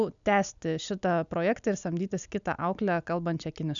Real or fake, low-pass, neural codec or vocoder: real; 7.2 kHz; none